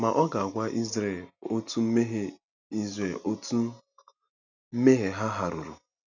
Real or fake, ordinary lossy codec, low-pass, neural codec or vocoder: real; none; 7.2 kHz; none